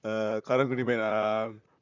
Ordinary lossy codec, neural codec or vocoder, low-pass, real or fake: none; vocoder, 44.1 kHz, 128 mel bands, Pupu-Vocoder; 7.2 kHz; fake